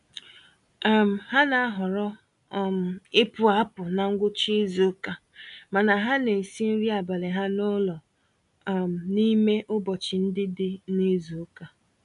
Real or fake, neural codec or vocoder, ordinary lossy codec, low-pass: real; none; none; 10.8 kHz